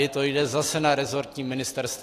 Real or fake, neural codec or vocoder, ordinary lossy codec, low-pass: real; none; AAC, 48 kbps; 14.4 kHz